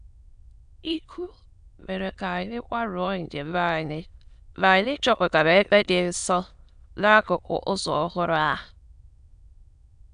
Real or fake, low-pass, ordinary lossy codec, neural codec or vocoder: fake; 9.9 kHz; AAC, 96 kbps; autoencoder, 22.05 kHz, a latent of 192 numbers a frame, VITS, trained on many speakers